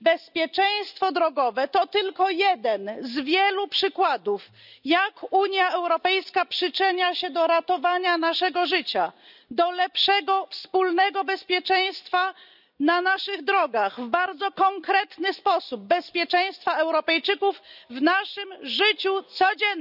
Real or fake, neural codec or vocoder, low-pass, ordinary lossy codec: real; none; 5.4 kHz; none